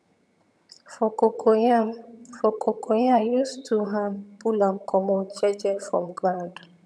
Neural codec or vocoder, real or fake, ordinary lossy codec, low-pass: vocoder, 22.05 kHz, 80 mel bands, HiFi-GAN; fake; none; none